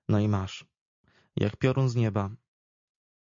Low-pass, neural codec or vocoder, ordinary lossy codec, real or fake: 7.2 kHz; none; MP3, 48 kbps; real